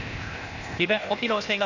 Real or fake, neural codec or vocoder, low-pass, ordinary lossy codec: fake; codec, 16 kHz, 0.8 kbps, ZipCodec; 7.2 kHz; none